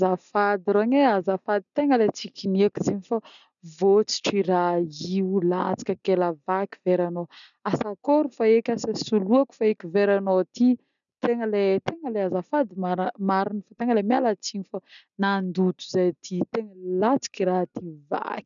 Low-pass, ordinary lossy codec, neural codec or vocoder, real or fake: 7.2 kHz; none; none; real